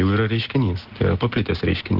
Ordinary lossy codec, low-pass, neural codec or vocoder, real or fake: Opus, 16 kbps; 5.4 kHz; none; real